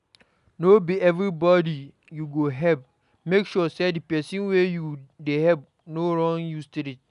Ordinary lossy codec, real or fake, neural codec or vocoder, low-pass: none; real; none; 10.8 kHz